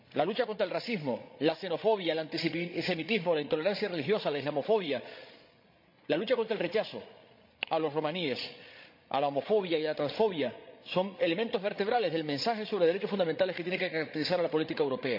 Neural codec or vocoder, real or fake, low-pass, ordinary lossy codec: codec, 16 kHz, 16 kbps, FreqCodec, larger model; fake; 5.4 kHz; MP3, 48 kbps